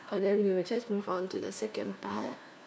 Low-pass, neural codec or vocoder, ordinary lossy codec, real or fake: none; codec, 16 kHz, 1 kbps, FunCodec, trained on LibriTTS, 50 frames a second; none; fake